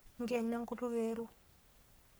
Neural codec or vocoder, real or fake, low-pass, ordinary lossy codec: codec, 44.1 kHz, 3.4 kbps, Pupu-Codec; fake; none; none